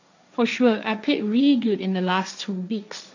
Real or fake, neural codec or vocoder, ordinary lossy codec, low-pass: fake; codec, 16 kHz, 1.1 kbps, Voila-Tokenizer; none; 7.2 kHz